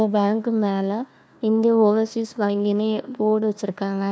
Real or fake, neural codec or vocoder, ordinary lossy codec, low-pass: fake; codec, 16 kHz, 1 kbps, FunCodec, trained on Chinese and English, 50 frames a second; none; none